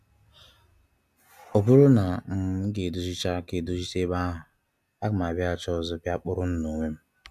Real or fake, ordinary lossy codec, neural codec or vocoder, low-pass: real; none; none; 14.4 kHz